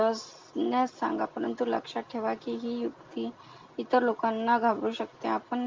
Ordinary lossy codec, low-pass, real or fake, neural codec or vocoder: Opus, 32 kbps; 7.2 kHz; real; none